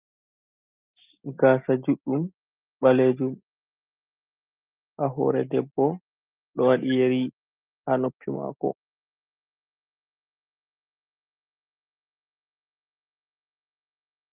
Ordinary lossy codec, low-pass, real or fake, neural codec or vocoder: Opus, 32 kbps; 3.6 kHz; real; none